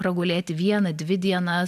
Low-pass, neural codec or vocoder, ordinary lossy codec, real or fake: 14.4 kHz; none; AAC, 96 kbps; real